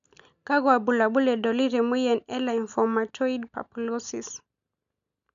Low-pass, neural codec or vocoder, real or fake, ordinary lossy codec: 7.2 kHz; none; real; none